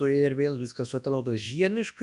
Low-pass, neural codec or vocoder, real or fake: 10.8 kHz; codec, 24 kHz, 0.9 kbps, WavTokenizer, large speech release; fake